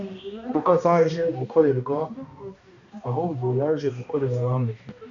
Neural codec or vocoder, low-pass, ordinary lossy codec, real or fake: codec, 16 kHz, 1 kbps, X-Codec, HuBERT features, trained on balanced general audio; 7.2 kHz; MP3, 64 kbps; fake